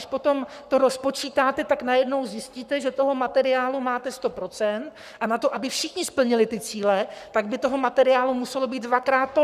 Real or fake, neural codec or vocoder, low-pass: fake; codec, 44.1 kHz, 7.8 kbps, Pupu-Codec; 14.4 kHz